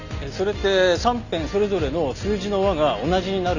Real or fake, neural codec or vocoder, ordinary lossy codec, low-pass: real; none; none; 7.2 kHz